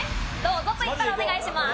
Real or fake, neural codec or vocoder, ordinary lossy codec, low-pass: real; none; none; none